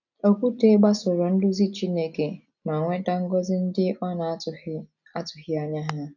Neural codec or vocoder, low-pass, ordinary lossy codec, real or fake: none; 7.2 kHz; none; real